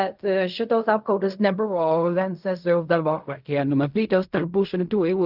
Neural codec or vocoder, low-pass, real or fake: codec, 16 kHz in and 24 kHz out, 0.4 kbps, LongCat-Audio-Codec, fine tuned four codebook decoder; 5.4 kHz; fake